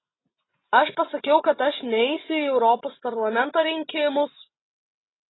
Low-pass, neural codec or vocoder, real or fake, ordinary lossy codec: 7.2 kHz; none; real; AAC, 16 kbps